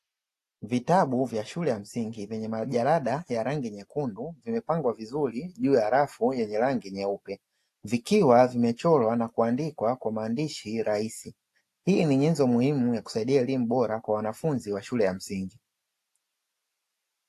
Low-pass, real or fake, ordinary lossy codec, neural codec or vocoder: 14.4 kHz; real; AAC, 64 kbps; none